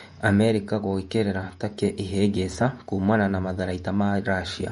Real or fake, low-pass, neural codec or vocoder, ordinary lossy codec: fake; 19.8 kHz; vocoder, 48 kHz, 128 mel bands, Vocos; MP3, 48 kbps